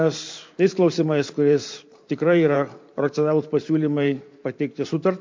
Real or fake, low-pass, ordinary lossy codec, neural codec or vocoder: fake; 7.2 kHz; MP3, 48 kbps; vocoder, 44.1 kHz, 128 mel bands every 512 samples, BigVGAN v2